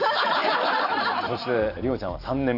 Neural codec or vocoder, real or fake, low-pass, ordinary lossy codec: vocoder, 44.1 kHz, 128 mel bands every 512 samples, BigVGAN v2; fake; 5.4 kHz; none